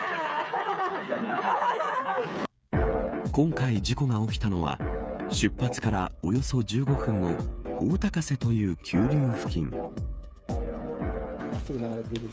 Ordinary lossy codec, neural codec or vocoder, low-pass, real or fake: none; codec, 16 kHz, 8 kbps, FreqCodec, smaller model; none; fake